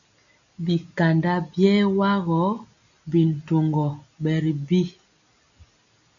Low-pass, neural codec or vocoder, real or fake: 7.2 kHz; none; real